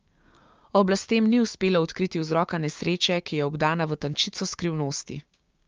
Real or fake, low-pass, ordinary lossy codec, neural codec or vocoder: fake; 7.2 kHz; Opus, 24 kbps; codec, 16 kHz, 6 kbps, DAC